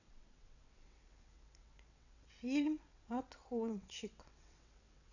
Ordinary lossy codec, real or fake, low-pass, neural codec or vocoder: none; fake; 7.2 kHz; vocoder, 22.05 kHz, 80 mel bands, WaveNeXt